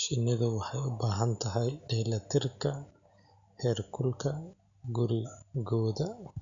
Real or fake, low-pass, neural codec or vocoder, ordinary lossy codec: real; 7.2 kHz; none; MP3, 96 kbps